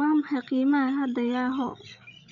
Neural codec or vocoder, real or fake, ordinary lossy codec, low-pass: codec, 16 kHz, 8 kbps, FreqCodec, larger model; fake; none; 7.2 kHz